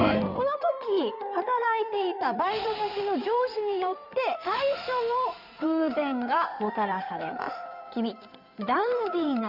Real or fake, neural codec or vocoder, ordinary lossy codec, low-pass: fake; codec, 16 kHz in and 24 kHz out, 2.2 kbps, FireRedTTS-2 codec; none; 5.4 kHz